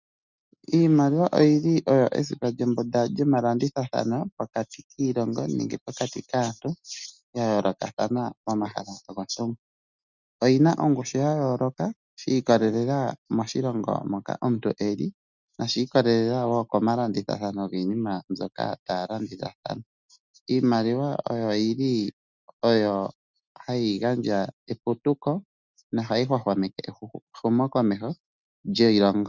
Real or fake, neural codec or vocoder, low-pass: real; none; 7.2 kHz